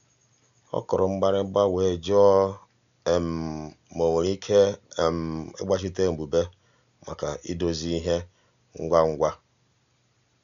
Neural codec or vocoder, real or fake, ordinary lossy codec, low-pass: none; real; none; 7.2 kHz